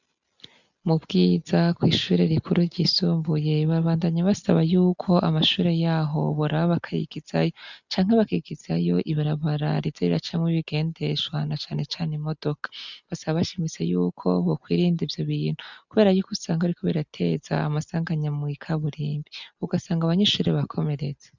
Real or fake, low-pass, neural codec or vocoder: real; 7.2 kHz; none